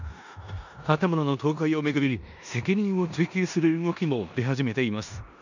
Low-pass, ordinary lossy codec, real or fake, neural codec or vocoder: 7.2 kHz; none; fake; codec, 16 kHz in and 24 kHz out, 0.9 kbps, LongCat-Audio-Codec, four codebook decoder